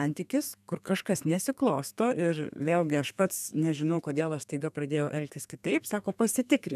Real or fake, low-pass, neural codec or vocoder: fake; 14.4 kHz; codec, 32 kHz, 1.9 kbps, SNAC